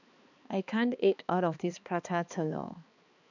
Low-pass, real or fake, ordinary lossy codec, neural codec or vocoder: 7.2 kHz; fake; none; codec, 16 kHz, 2 kbps, X-Codec, HuBERT features, trained on balanced general audio